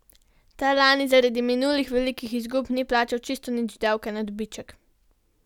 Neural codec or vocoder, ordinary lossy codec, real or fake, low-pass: none; none; real; 19.8 kHz